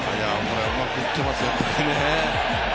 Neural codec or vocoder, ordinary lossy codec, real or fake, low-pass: none; none; real; none